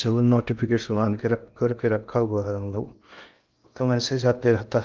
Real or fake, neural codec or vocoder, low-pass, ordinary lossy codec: fake; codec, 16 kHz in and 24 kHz out, 0.6 kbps, FocalCodec, streaming, 2048 codes; 7.2 kHz; Opus, 24 kbps